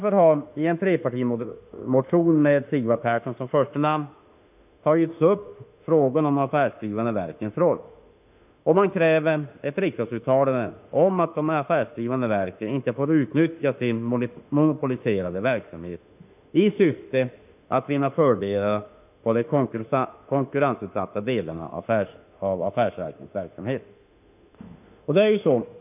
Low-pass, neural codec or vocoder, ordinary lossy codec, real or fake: 3.6 kHz; autoencoder, 48 kHz, 32 numbers a frame, DAC-VAE, trained on Japanese speech; none; fake